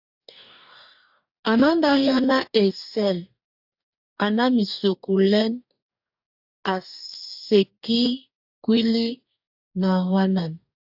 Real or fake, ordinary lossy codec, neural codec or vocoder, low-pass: fake; AAC, 48 kbps; codec, 44.1 kHz, 2.6 kbps, DAC; 5.4 kHz